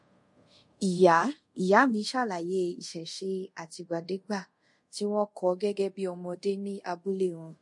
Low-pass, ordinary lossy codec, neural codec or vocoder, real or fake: 10.8 kHz; MP3, 48 kbps; codec, 24 kHz, 0.5 kbps, DualCodec; fake